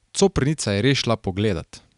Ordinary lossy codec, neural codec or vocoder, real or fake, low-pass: none; none; real; 10.8 kHz